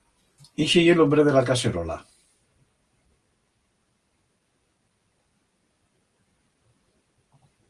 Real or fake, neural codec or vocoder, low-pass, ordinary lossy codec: real; none; 10.8 kHz; Opus, 24 kbps